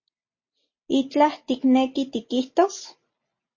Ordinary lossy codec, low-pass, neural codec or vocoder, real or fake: MP3, 32 kbps; 7.2 kHz; none; real